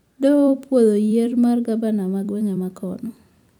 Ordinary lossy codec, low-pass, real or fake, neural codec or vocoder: none; 19.8 kHz; fake; vocoder, 44.1 kHz, 128 mel bands every 256 samples, BigVGAN v2